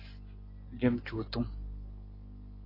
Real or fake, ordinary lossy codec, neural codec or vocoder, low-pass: real; AAC, 24 kbps; none; 5.4 kHz